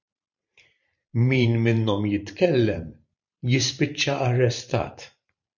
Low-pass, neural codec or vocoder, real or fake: 7.2 kHz; vocoder, 44.1 kHz, 128 mel bands every 512 samples, BigVGAN v2; fake